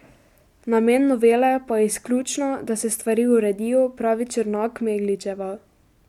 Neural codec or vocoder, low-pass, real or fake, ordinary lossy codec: none; 19.8 kHz; real; MP3, 96 kbps